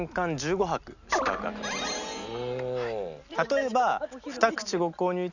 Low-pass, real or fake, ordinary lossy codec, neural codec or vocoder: 7.2 kHz; real; none; none